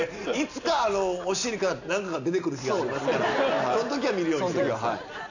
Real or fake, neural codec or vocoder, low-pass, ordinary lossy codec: real; none; 7.2 kHz; none